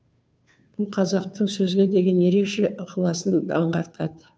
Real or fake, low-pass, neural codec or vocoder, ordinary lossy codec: fake; none; codec, 16 kHz, 2 kbps, FunCodec, trained on Chinese and English, 25 frames a second; none